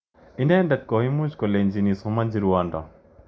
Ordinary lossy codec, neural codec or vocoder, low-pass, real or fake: none; none; none; real